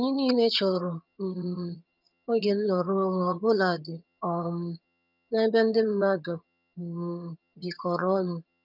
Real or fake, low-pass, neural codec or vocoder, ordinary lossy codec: fake; 5.4 kHz; vocoder, 22.05 kHz, 80 mel bands, HiFi-GAN; none